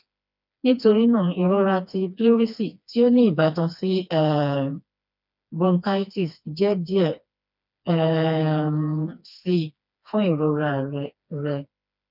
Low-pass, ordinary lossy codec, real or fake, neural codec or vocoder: 5.4 kHz; none; fake; codec, 16 kHz, 2 kbps, FreqCodec, smaller model